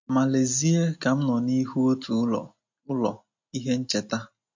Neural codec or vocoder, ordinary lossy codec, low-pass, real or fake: none; MP3, 48 kbps; 7.2 kHz; real